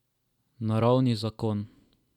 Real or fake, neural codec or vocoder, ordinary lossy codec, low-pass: real; none; none; 19.8 kHz